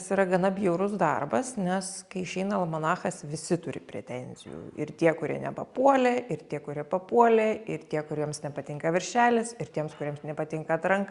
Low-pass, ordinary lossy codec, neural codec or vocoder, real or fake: 10.8 kHz; Opus, 64 kbps; none; real